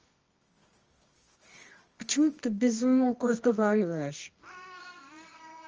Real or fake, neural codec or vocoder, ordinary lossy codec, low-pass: fake; codec, 24 kHz, 0.9 kbps, WavTokenizer, medium music audio release; Opus, 24 kbps; 7.2 kHz